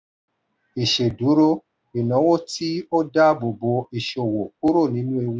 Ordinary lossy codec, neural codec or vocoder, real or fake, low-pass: none; none; real; none